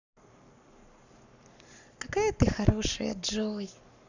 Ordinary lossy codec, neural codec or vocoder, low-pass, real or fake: none; codec, 44.1 kHz, 7.8 kbps, Pupu-Codec; 7.2 kHz; fake